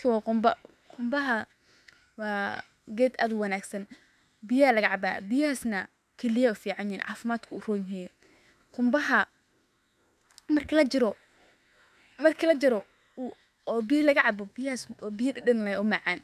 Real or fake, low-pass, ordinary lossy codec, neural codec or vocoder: fake; 14.4 kHz; none; autoencoder, 48 kHz, 32 numbers a frame, DAC-VAE, trained on Japanese speech